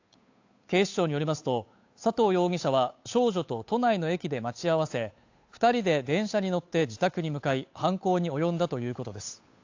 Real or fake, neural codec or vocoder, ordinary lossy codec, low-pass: fake; codec, 16 kHz, 8 kbps, FunCodec, trained on Chinese and English, 25 frames a second; AAC, 48 kbps; 7.2 kHz